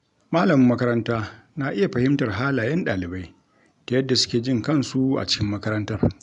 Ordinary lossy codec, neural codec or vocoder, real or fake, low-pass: none; none; real; 10.8 kHz